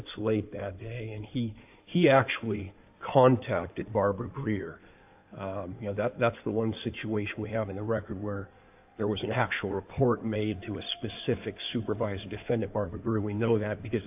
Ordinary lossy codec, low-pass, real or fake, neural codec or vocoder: AAC, 32 kbps; 3.6 kHz; fake; codec, 16 kHz in and 24 kHz out, 2.2 kbps, FireRedTTS-2 codec